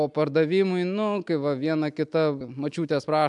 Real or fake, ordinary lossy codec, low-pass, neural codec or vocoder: real; MP3, 96 kbps; 10.8 kHz; none